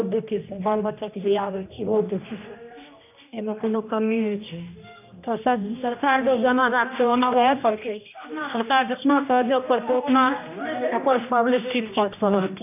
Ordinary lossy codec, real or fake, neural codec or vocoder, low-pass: none; fake; codec, 16 kHz, 1 kbps, X-Codec, HuBERT features, trained on general audio; 3.6 kHz